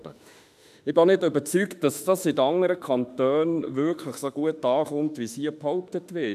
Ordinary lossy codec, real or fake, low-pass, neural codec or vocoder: none; fake; 14.4 kHz; autoencoder, 48 kHz, 32 numbers a frame, DAC-VAE, trained on Japanese speech